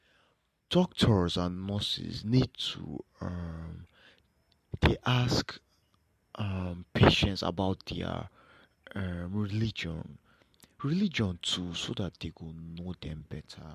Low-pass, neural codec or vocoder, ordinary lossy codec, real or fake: 14.4 kHz; none; MP3, 64 kbps; real